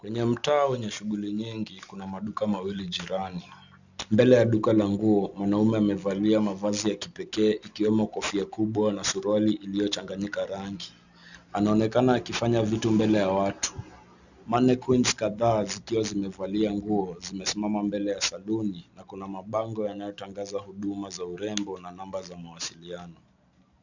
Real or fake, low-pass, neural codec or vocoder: real; 7.2 kHz; none